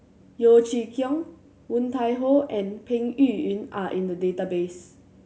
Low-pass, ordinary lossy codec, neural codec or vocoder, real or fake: none; none; none; real